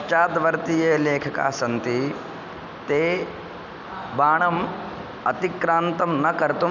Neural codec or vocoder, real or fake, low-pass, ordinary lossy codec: none; real; 7.2 kHz; none